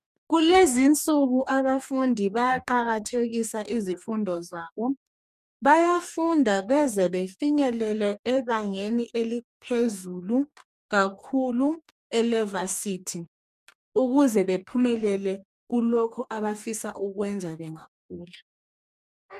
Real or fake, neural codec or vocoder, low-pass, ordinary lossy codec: fake; codec, 44.1 kHz, 2.6 kbps, DAC; 14.4 kHz; MP3, 96 kbps